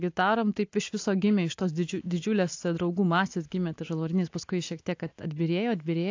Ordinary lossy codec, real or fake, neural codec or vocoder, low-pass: AAC, 48 kbps; real; none; 7.2 kHz